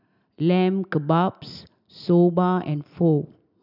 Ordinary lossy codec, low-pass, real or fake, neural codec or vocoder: none; 5.4 kHz; real; none